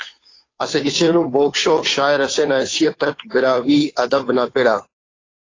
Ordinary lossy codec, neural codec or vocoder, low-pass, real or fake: AAC, 32 kbps; codec, 16 kHz, 2 kbps, FunCodec, trained on Chinese and English, 25 frames a second; 7.2 kHz; fake